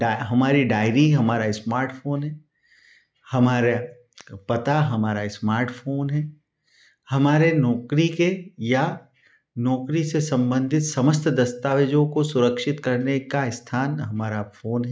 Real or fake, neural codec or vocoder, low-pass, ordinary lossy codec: real; none; none; none